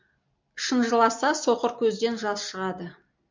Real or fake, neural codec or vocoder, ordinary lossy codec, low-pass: real; none; MP3, 64 kbps; 7.2 kHz